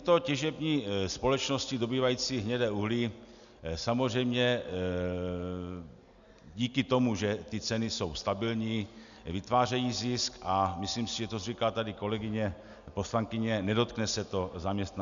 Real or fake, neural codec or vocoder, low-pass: real; none; 7.2 kHz